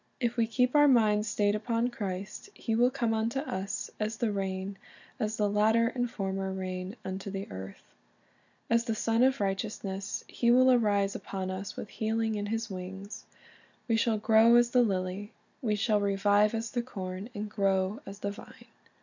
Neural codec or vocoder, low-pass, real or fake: none; 7.2 kHz; real